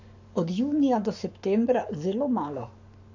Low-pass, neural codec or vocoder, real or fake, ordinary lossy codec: 7.2 kHz; codec, 44.1 kHz, 7.8 kbps, Pupu-Codec; fake; none